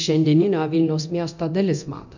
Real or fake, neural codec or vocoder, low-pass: fake; codec, 24 kHz, 0.9 kbps, DualCodec; 7.2 kHz